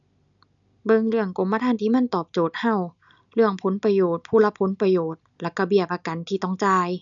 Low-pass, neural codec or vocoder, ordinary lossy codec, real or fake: 7.2 kHz; none; none; real